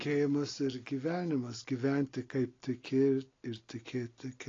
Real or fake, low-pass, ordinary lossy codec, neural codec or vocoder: real; 7.2 kHz; AAC, 32 kbps; none